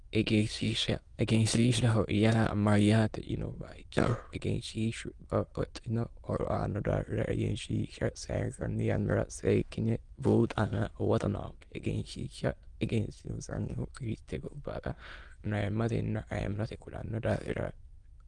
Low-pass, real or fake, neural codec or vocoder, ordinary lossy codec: 9.9 kHz; fake; autoencoder, 22.05 kHz, a latent of 192 numbers a frame, VITS, trained on many speakers; Opus, 24 kbps